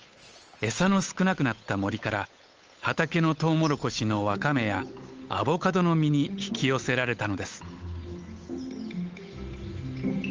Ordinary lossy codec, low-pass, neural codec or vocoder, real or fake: Opus, 24 kbps; 7.2 kHz; codec, 16 kHz, 8 kbps, FunCodec, trained on Chinese and English, 25 frames a second; fake